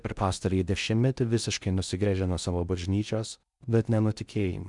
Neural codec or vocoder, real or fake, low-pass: codec, 16 kHz in and 24 kHz out, 0.6 kbps, FocalCodec, streaming, 4096 codes; fake; 10.8 kHz